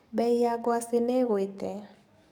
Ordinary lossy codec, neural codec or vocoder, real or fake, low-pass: none; codec, 44.1 kHz, 7.8 kbps, DAC; fake; 19.8 kHz